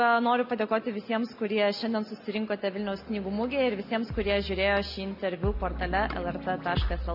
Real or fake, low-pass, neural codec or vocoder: real; 5.4 kHz; none